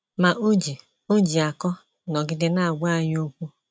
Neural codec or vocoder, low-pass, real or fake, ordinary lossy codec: none; none; real; none